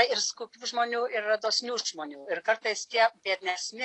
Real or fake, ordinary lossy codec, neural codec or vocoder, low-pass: real; AAC, 48 kbps; none; 10.8 kHz